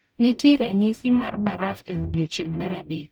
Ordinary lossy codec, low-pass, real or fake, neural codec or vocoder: none; none; fake; codec, 44.1 kHz, 0.9 kbps, DAC